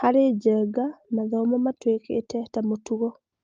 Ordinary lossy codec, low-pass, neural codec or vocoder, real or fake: Opus, 24 kbps; 7.2 kHz; none; real